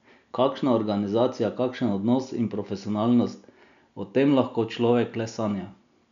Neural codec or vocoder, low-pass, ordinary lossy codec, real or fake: none; 7.2 kHz; none; real